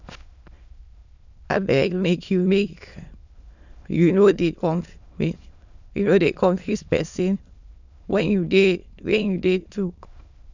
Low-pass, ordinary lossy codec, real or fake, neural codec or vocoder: 7.2 kHz; none; fake; autoencoder, 22.05 kHz, a latent of 192 numbers a frame, VITS, trained on many speakers